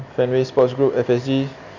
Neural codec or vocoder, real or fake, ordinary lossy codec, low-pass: none; real; none; 7.2 kHz